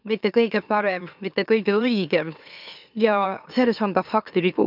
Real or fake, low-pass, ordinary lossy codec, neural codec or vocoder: fake; 5.4 kHz; none; autoencoder, 44.1 kHz, a latent of 192 numbers a frame, MeloTTS